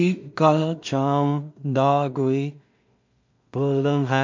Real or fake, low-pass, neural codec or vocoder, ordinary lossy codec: fake; 7.2 kHz; codec, 16 kHz in and 24 kHz out, 0.4 kbps, LongCat-Audio-Codec, two codebook decoder; MP3, 48 kbps